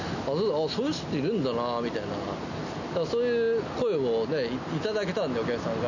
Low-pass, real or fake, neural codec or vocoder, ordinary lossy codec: 7.2 kHz; real; none; none